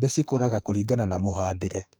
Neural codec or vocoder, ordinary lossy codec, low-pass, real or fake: codec, 44.1 kHz, 2.6 kbps, SNAC; none; none; fake